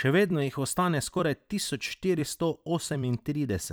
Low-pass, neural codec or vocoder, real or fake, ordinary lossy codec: none; vocoder, 44.1 kHz, 128 mel bands every 256 samples, BigVGAN v2; fake; none